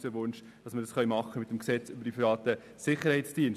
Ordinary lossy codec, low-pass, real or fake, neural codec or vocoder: none; 14.4 kHz; real; none